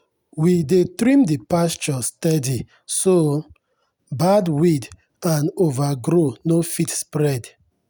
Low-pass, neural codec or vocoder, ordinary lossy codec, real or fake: none; none; none; real